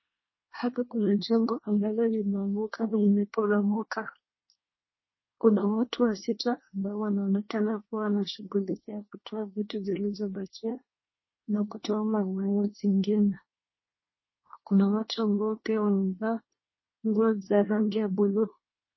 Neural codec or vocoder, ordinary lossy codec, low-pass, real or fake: codec, 24 kHz, 1 kbps, SNAC; MP3, 24 kbps; 7.2 kHz; fake